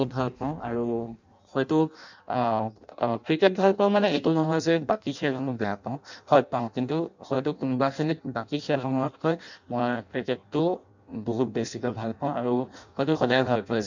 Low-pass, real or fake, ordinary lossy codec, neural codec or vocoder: 7.2 kHz; fake; none; codec, 16 kHz in and 24 kHz out, 0.6 kbps, FireRedTTS-2 codec